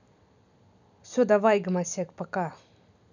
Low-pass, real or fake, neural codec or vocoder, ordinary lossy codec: 7.2 kHz; real; none; none